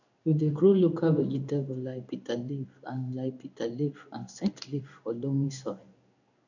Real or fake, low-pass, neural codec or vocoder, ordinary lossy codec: fake; 7.2 kHz; codec, 16 kHz in and 24 kHz out, 1 kbps, XY-Tokenizer; none